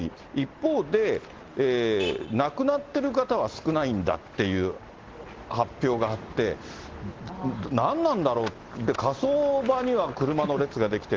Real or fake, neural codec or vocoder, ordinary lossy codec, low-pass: real; none; Opus, 16 kbps; 7.2 kHz